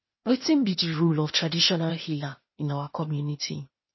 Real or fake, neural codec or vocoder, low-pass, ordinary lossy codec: fake; codec, 16 kHz, 0.8 kbps, ZipCodec; 7.2 kHz; MP3, 24 kbps